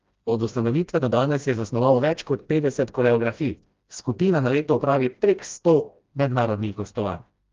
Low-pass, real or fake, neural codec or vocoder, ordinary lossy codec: 7.2 kHz; fake; codec, 16 kHz, 1 kbps, FreqCodec, smaller model; Opus, 32 kbps